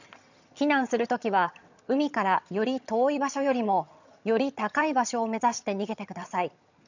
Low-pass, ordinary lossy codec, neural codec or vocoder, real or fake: 7.2 kHz; none; vocoder, 22.05 kHz, 80 mel bands, HiFi-GAN; fake